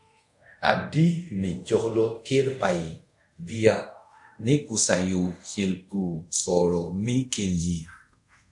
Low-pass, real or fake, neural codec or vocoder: 10.8 kHz; fake; codec, 24 kHz, 0.5 kbps, DualCodec